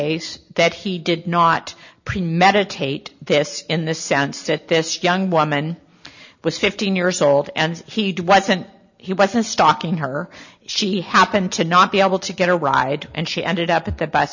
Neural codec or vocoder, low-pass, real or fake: none; 7.2 kHz; real